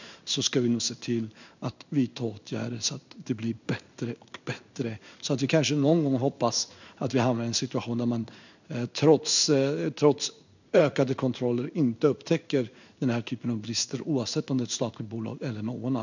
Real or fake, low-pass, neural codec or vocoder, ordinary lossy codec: fake; 7.2 kHz; codec, 16 kHz in and 24 kHz out, 1 kbps, XY-Tokenizer; none